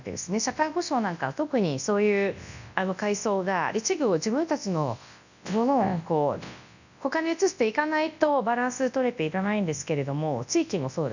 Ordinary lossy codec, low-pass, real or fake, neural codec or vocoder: none; 7.2 kHz; fake; codec, 24 kHz, 0.9 kbps, WavTokenizer, large speech release